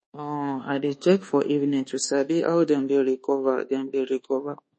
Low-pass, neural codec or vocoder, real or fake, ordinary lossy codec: 10.8 kHz; codec, 24 kHz, 1.2 kbps, DualCodec; fake; MP3, 32 kbps